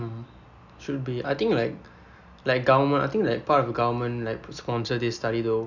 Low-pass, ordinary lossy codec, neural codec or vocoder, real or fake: 7.2 kHz; none; none; real